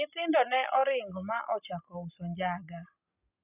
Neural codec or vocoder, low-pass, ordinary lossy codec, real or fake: none; 3.6 kHz; none; real